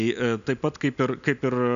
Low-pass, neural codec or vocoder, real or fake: 7.2 kHz; none; real